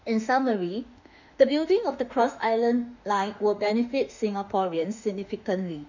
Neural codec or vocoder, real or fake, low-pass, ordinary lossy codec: autoencoder, 48 kHz, 32 numbers a frame, DAC-VAE, trained on Japanese speech; fake; 7.2 kHz; none